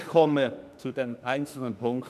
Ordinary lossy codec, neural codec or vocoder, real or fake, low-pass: none; autoencoder, 48 kHz, 32 numbers a frame, DAC-VAE, trained on Japanese speech; fake; 14.4 kHz